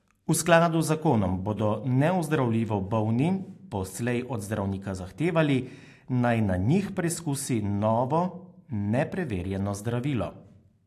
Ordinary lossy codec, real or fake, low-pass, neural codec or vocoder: AAC, 64 kbps; real; 14.4 kHz; none